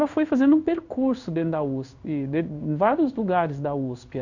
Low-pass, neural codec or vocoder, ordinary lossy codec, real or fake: 7.2 kHz; codec, 16 kHz in and 24 kHz out, 1 kbps, XY-Tokenizer; none; fake